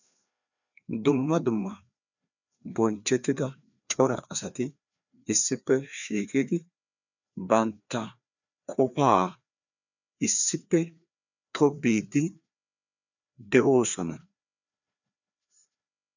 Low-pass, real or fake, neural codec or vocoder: 7.2 kHz; fake; codec, 16 kHz, 2 kbps, FreqCodec, larger model